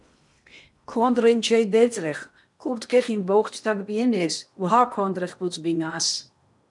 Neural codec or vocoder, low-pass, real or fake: codec, 16 kHz in and 24 kHz out, 0.8 kbps, FocalCodec, streaming, 65536 codes; 10.8 kHz; fake